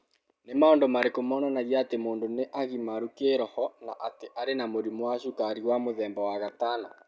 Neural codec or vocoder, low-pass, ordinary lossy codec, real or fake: none; none; none; real